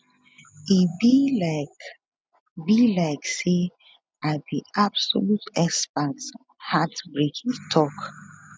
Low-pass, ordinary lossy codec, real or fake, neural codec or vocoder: none; none; real; none